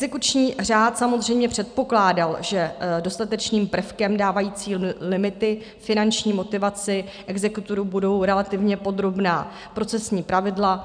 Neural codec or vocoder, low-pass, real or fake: none; 9.9 kHz; real